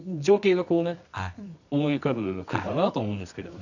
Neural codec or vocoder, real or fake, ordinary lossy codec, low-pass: codec, 24 kHz, 0.9 kbps, WavTokenizer, medium music audio release; fake; none; 7.2 kHz